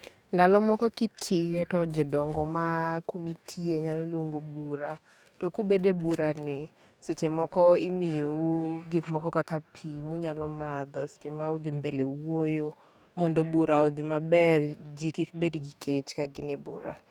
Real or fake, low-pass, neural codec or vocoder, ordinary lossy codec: fake; 19.8 kHz; codec, 44.1 kHz, 2.6 kbps, DAC; none